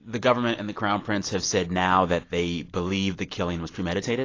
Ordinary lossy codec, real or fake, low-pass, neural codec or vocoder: AAC, 32 kbps; real; 7.2 kHz; none